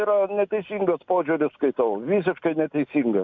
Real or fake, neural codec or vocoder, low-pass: real; none; 7.2 kHz